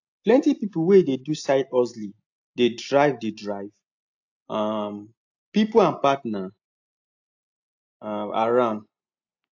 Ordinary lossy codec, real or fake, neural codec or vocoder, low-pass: AAC, 48 kbps; real; none; 7.2 kHz